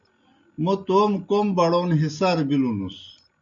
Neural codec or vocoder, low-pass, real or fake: none; 7.2 kHz; real